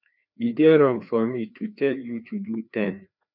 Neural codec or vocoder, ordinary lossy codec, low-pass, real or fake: codec, 16 kHz, 2 kbps, FreqCodec, larger model; none; 5.4 kHz; fake